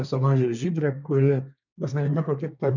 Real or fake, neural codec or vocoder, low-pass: fake; codec, 24 kHz, 1 kbps, SNAC; 7.2 kHz